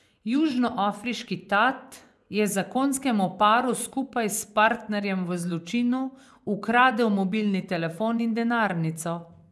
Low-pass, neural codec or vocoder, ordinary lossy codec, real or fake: none; vocoder, 24 kHz, 100 mel bands, Vocos; none; fake